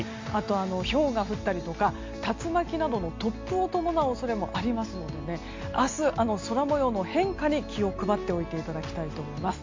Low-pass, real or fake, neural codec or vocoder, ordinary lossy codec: 7.2 kHz; real; none; MP3, 48 kbps